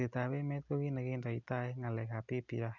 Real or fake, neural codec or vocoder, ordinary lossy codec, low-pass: real; none; none; 7.2 kHz